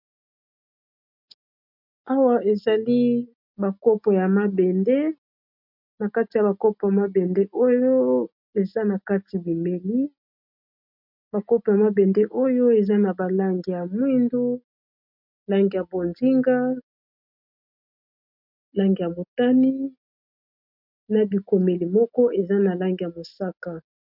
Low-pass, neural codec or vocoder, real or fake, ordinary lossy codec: 5.4 kHz; none; real; MP3, 48 kbps